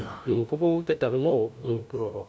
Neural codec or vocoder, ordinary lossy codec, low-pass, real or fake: codec, 16 kHz, 0.5 kbps, FunCodec, trained on LibriTTS, 25 frames a second; none; none; fake